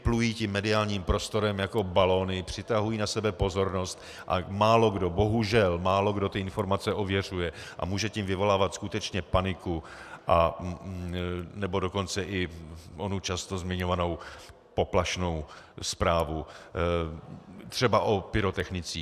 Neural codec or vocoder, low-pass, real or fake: none; 14.4 kHz; real